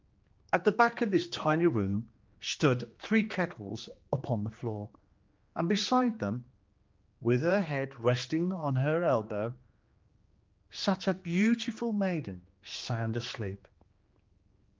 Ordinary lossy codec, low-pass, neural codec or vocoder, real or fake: Opus, 32 kbps; 7.2 kHz; codec, 16 kHz, 2 kbps, X-Codec, HuBERT features, trained on general audio; fake